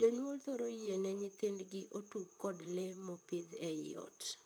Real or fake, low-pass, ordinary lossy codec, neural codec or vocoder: fake; none; none; vocoder, 44.1 kHz, 128 mel bands, Pupu-Vocoder